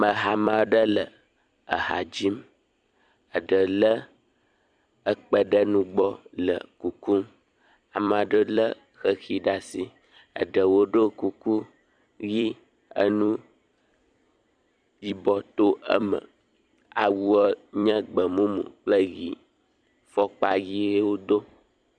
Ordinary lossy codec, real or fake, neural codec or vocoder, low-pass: Opus, 64 kbps; fake; vocoder, 44.1 kHz, 128 mel bands every 256 samples, BigVGAN v2; 9.9 kHz